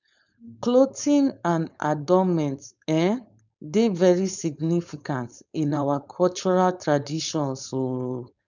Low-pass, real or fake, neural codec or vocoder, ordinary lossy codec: 7.2 kHz; fake; codec, 16 kHz, 4.8 kbps, FACodec; none